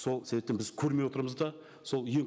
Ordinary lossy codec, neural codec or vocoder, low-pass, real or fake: none; none; none; real